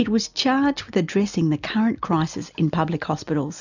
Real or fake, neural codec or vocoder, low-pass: real; none; 7.2 kHz